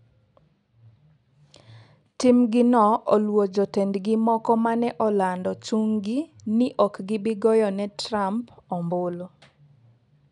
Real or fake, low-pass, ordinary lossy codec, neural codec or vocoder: real; 10.8 kHz; none; none